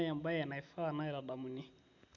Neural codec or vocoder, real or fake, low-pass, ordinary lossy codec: none; real; 7.2 kHz; MP3, 64 kbps